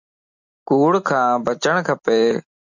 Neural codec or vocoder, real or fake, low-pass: none; real; 7.2 kHz